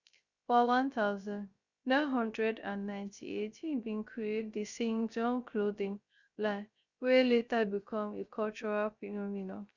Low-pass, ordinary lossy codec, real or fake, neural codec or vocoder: 7.2 kHz; Opus, 64 kbps; fake; codec, 16 kHz, 0.3 kbps, FocalCodec